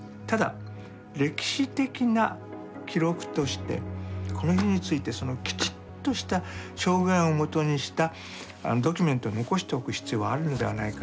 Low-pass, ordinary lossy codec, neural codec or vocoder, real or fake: none; none; none; real